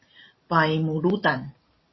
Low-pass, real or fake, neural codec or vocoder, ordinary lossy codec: 7.2 kHz; real; none; MP3, 24 kbps